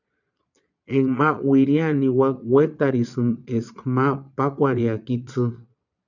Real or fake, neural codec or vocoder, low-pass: fake; vocoder, 22.05 kHz, 80 mel bands, Vocos; 7.2 kHz